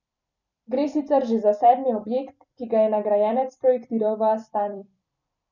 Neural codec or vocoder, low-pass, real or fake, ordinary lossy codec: vocoder, 44.1 kHz, 128 mel bands every 256 samples, BigVGAN v2; 7.2 kHz; fake; none